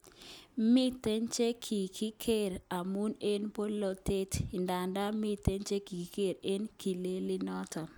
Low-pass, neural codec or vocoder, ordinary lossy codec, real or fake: none; none; none; real